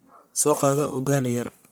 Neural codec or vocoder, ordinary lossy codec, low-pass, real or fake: codec, 44.1 kHz, 1.7 kbps, Pupu-Codec; none; none; fake